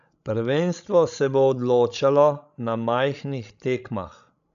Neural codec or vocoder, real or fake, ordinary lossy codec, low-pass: codec, 16 kHz, 16 kbps, FreqCodec, larger model; fake; none; 7.2 kHz